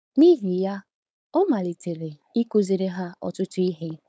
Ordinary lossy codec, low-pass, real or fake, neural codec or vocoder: none; none; fake; codec, 16 kHz, 4.8 kbps, FACodec